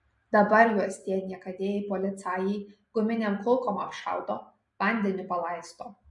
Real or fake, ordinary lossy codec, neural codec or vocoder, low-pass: real; MP3, 48 kbps; none; 10.8 kHz